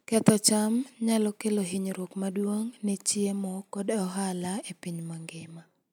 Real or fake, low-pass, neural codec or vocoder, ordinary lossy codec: real; none; none; none